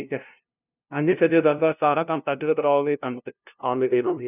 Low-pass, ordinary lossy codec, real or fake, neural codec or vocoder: 3.6 kHz; none; fake; codec, 16 kHz, 0.5 kbps, FunCodec, trained on LibriTTS, 25 frames a second